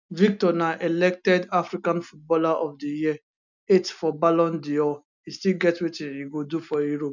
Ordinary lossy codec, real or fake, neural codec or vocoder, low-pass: none; real; none; 7.2 kHz